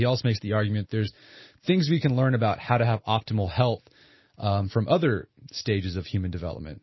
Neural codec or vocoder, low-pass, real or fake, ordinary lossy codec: none; 7.2 kHz; real; MP3, 24 kbps